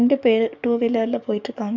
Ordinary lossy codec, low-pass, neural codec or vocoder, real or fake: none; 7.2 kHz; codec, 44.1 kHz, 7.8 kbps, DAC; fake